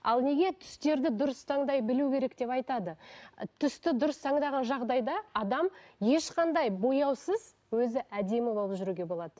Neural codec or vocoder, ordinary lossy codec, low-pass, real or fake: none; none; none; real